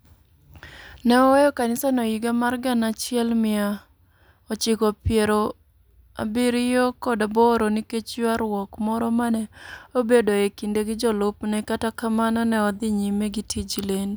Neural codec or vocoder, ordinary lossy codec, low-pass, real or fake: none; none; none; real